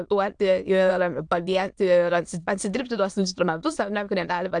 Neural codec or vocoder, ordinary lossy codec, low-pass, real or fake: autoencoder, 22.05 kHz, a latent of 192 numbers a frame, VITS, trained on many speakers; AAC, 64 kbps; 9.9 kHz; fake